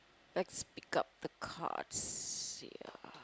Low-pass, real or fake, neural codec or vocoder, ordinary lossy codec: none; real; none; none